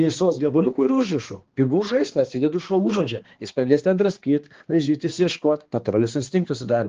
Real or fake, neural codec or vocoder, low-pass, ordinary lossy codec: fake; codec, 16 kHz, 2 kbps, X-Codec, HuBERT features, trained on balanced general audio; 7.2 kHz; Opus, 16 kbps